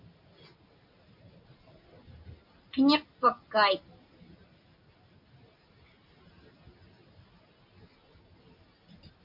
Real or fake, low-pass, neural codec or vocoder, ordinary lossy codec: real; 5.4 kHz; none; MP3, 48 kbps